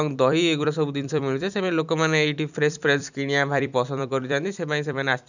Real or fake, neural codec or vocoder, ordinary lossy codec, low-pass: fake; vocoder, 44.1 kHz, 128 mel bands every 256 samples, BigVGAN v2; none; 7.2 kHz